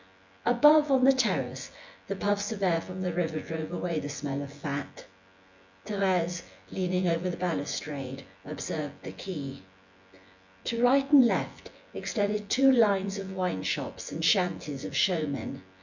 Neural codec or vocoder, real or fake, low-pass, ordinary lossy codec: vocoder, 24 kHz, 100 mel bands, Vocos; fake; 7.2 kHz; MP3, 64 kbps